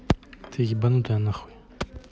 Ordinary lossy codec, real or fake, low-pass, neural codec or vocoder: none; real; none; none